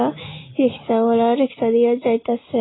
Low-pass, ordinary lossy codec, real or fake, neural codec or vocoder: 7.2 kHz; AAC, 16 kbps; real; none